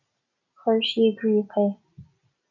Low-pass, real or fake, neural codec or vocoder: 7.2 kHz; real; none